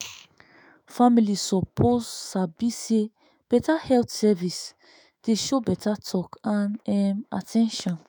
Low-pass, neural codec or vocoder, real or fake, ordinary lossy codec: none; autoencoder, 48 kHz, 128 numbers a frame, DAC-VAE, trained on Japanese speech; fake; none